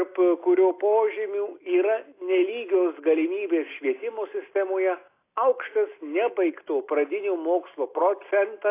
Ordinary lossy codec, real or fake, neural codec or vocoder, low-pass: AAC, 24 kbps; real; none; 3.6 kHz